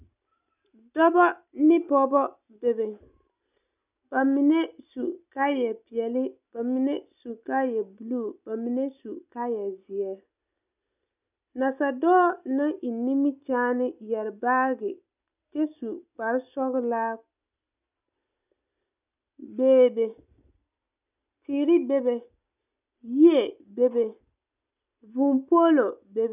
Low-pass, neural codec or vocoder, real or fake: 3.6 kHz; none; real